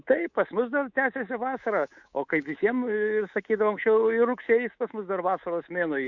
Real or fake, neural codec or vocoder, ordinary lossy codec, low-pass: fake; codec, 24 kHz, 3.1 kbps, DualCodec; Opus, 64 kbps; 7.2 kHz